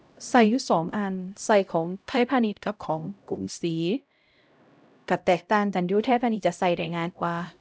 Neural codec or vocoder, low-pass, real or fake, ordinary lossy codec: codec, 16 kHz, 0.5 kbps, X-Codec, HuBERT features, trained on LibriSpeech; none; fake; none